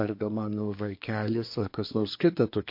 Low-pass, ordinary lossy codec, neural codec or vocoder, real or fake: 5.4 kHz; MP3, 32 kbps; codec, 24 kHz, 1 kbps, SNAC; fake